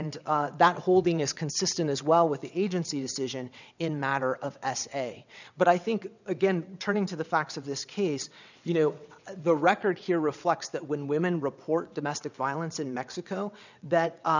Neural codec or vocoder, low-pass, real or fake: vocoder, 22.05 kHz, 80 mel bands, WaveNeXt; 7.2 kHz; fake